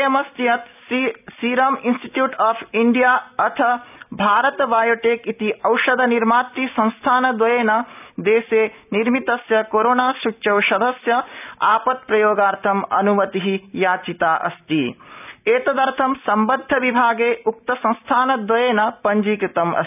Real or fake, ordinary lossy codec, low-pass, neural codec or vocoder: real; none; 3.6 kHz; none